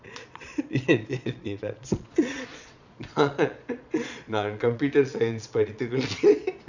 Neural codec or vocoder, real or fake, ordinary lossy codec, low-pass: vocoder, 22.05 kHz, 80 mel bands, Vocos; fake; none; 7.2 kHz